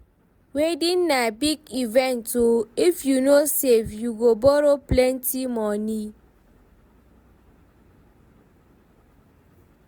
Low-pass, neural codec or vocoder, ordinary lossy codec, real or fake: none; none; none; real